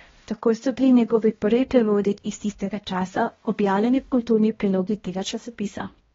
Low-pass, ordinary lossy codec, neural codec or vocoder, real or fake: 7.2 kHz; AAC, 24 kbps; codec, 16 kHz, 1 kbps, X-Codec, HuBERT features, trained on balanced general audio; fake